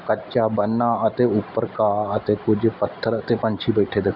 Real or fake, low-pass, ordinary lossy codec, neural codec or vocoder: real; 5.4 kHz; none; none